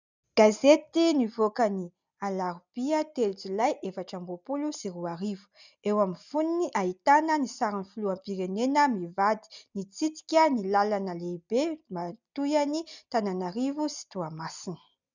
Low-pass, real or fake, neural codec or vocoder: 7.2 kHz; real; none